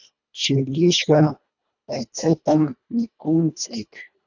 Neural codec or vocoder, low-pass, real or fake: codec, 24 kHz, 1.5 kbps, HILCodec; 7.2 kHz; fake